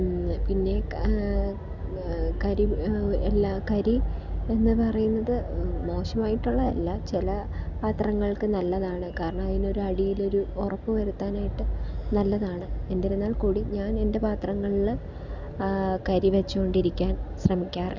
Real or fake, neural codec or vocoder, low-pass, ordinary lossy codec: real; none; 7.2 kHz; none